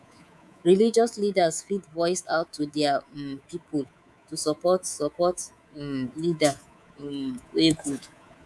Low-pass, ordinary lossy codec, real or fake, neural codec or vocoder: none; none; fake; codec, 24 kHz, 3.1 kbps, DualCodec